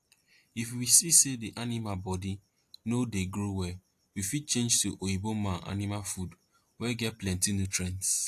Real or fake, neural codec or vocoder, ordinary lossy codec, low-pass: real; none; AAC, 64 kbps; 14.4 kHz